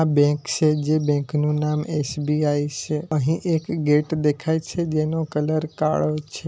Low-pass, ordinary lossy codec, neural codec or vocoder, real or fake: none; none; none; real